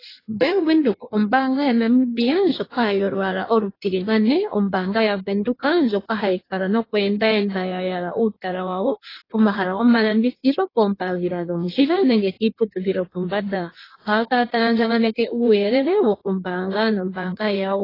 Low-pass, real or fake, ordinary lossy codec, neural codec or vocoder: 5.4 kHz; fake; AAC, 24 kbps; codec, 16 kHz in and 24 kHz out, 1.1 kbps, FireRedTTS-2 codec